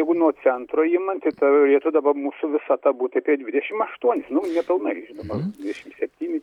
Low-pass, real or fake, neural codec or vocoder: 19.8 kHz; real; none